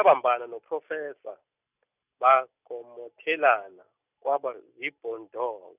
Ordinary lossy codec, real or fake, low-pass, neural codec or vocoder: none; real; 3.6 kHz; none